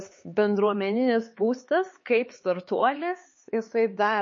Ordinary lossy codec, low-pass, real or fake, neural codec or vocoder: MP3, 32 kbps; 7.2 kHz; fake; codec, 16 kHz, 4 kbps, X-Codec, HuBERT features, trained on LibriSpeech